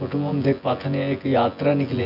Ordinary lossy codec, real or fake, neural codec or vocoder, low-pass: none; fake; vocoder, 24 kHz, 100 mel bands, Vocos; 5.4 kHz